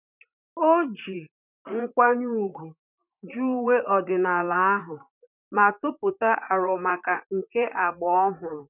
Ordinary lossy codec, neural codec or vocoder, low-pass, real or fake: none; vocoder, 44.1 kHz, 128 mel bands, Pupu-Vocoder; 3.6 kHz; fake